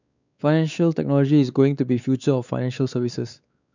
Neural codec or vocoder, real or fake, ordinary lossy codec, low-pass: codec, 16 kHz, 4 kbps, X-Codec, WavLM features, trained on Multilingual LibriSpeech; fake; none; 7.2 kHz